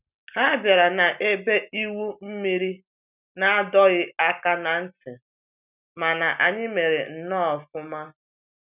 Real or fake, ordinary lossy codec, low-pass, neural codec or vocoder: real; none; 3.6 kHz; none